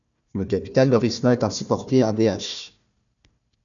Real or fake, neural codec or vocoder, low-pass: fake; codec, 16 kHz, 1 kbps, FunCodec, trained on Chinese and English, 50 frames a second; 7.2 kHz